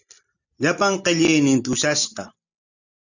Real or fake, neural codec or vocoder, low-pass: real; none; 7.2 kHz